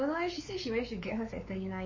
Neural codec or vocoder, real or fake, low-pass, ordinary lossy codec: codec, 16 kHz, 4 kbps, X-Codec, WavLM features, trained on Multilingual LibriSpeech; fake; 7.2 kHz; MP3, 32 kbps